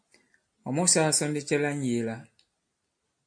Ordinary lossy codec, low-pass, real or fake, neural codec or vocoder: MP3, 48 kbps; 9.9 kHz; real; none